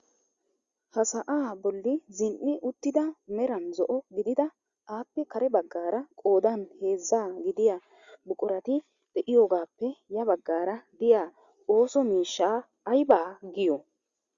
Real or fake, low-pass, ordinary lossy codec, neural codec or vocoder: real; 7.2 kHz; Opus, 64 kbps; none